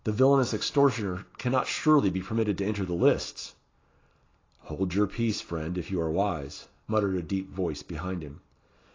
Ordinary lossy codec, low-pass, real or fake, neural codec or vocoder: AAC, 32 kbps; 7.2 kHz; real; none